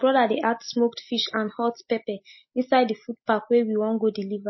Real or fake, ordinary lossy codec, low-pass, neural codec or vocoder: real; MP3, 24 kbps; 7.2 kHz; none